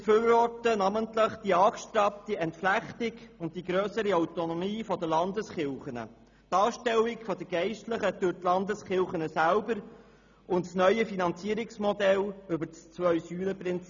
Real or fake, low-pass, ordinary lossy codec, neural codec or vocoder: real; 7.2 kHz; none; none